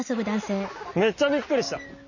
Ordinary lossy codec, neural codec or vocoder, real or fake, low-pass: none; none; real; 7.2 kHz